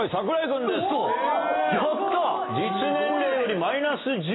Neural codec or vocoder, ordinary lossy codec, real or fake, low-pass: none; AAC, 16 kbps; real; 7.2 kHz